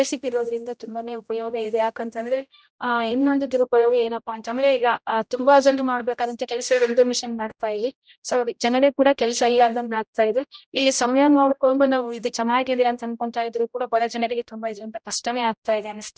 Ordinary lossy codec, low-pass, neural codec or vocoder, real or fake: none; none; codec, 16 kHz, 0.5 kbps, X-Codec, HuBERT features, trained on general audio; fake